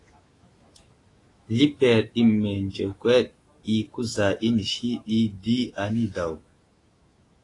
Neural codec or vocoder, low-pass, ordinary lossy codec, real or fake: autoencoder, 48 kHz, 128 numbers a frame, DAC-VAE, trained on Japanese speech; 10.8 kHz; AAC, 32 kbps; fake